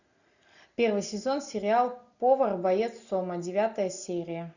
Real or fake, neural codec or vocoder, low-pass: real; none; 7.2 kHz